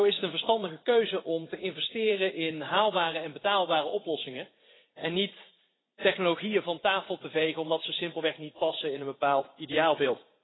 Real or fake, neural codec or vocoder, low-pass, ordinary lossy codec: fake; vocoder, 44.1 kHz, 80 mel bands, Vocos; 7.2 kHz; AAC, 16 kbps